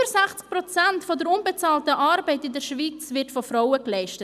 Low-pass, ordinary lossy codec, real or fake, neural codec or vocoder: 14.4 kHz; none; real; none